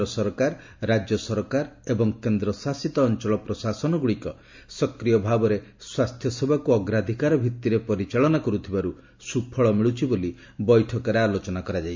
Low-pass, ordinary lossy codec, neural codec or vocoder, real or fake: 7.2 kHz; MP3, 64 kbps; none; real